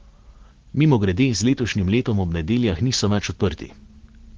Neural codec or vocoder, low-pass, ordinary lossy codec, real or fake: none; 7.2 kHz; Opus, 16 kbps; real